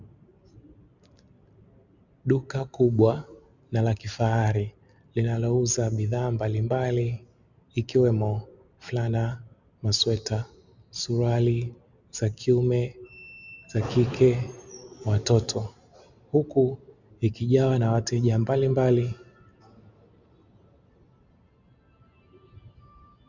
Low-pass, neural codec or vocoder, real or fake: 7.2 kHz; none; real